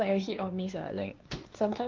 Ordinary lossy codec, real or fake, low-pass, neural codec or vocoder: Opus, 32 kbps; fake; 7.2 kHz; codec, 16 kHz, 2 kbps, X-Codec, WavLM features, trained on Multilingual LibriSpeech